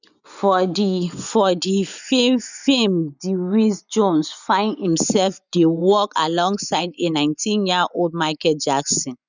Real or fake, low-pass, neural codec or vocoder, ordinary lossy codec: fake; 7.2 kHz; vocoder, 24 kHz, 100 mel bands, Vocos; none